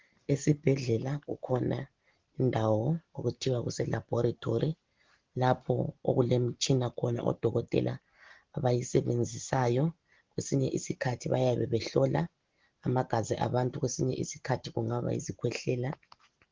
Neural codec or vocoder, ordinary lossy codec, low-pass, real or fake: none; Opus, 16 kbps; 7.2 kHz; real